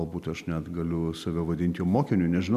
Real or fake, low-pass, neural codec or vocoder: real; 14.4 kHz; none